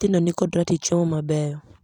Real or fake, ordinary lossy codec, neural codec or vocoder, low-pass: fake; none; vocoder, 44.1 kHz, 128 mel bands every 256 samples, BigVGAN v2; 19.8 kHz